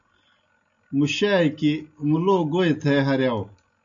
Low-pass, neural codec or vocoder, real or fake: 7.2 kHz; none; real